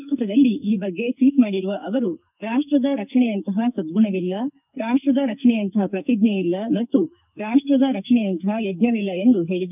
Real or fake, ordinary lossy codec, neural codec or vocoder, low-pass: fake; none; codec, 44.1 kHz, 2.6 kbps, SNAC; 3.6 kHz